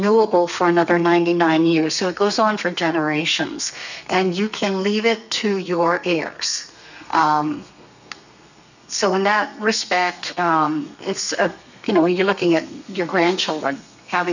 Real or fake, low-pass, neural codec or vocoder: fake; 7.2 kHz; codec, 32 kHz, 1.9 kbps, SNAC